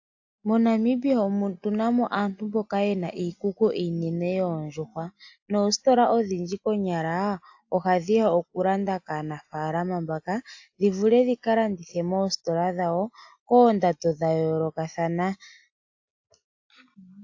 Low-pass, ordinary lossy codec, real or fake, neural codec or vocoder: 7.2 kHz; AAC, 48 kbps; real; none